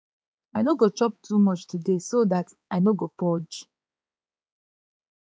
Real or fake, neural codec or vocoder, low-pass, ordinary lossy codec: fake; codec, 16 kHz, 4 kbps, X-Codec, HuBERT features, trained on balanced general audio; none; none